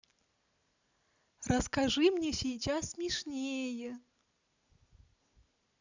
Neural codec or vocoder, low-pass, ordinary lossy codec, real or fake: none; 7.2 kHz; none; real